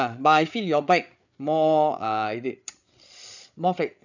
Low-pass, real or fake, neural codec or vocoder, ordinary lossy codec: 7.2 kHz; fake; codec, 16 kHz, 8 kbps, FreqCodec, larger model; none